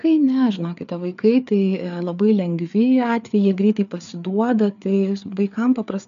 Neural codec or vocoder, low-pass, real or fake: codec, 16 kHz, 4 kbps, FreqCodec, larger model; 7.2 kHz; fake